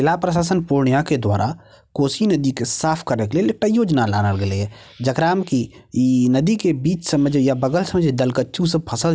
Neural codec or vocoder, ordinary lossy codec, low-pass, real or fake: none; none; none; real